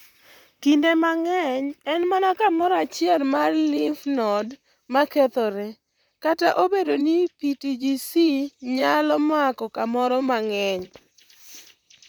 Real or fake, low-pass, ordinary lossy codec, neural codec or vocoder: fake; 19.8 kHz; none; vocoder, 44.1 kHz, 128 mel bands, Pupu-Vocoder